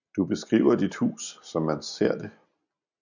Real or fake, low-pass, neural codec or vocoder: real; 7.2 kHz; none